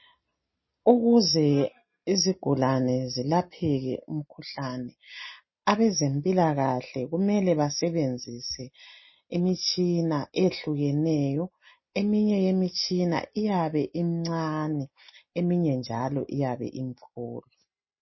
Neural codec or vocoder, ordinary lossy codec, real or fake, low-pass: none; MP3, 24 kbps; real; 7.2 kHz